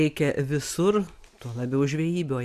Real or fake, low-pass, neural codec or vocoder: real; 14.4 kHz; none